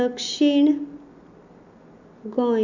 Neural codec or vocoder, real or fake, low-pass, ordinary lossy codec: none; real; 7.2 kHz; none